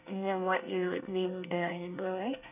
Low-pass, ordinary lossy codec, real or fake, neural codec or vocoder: 3.6 kHz; none; fake; codec, 24 kHz, 1 kbps, SNAC